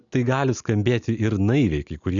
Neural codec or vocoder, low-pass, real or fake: none; 7.2 kHz; real